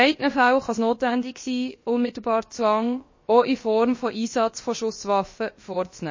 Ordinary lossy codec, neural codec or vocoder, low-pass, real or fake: MP3, 32 kbps; codec, 16 kHz, about 1 kbps, DyCAST, with the encoder's durations; 7.2 kHz; fake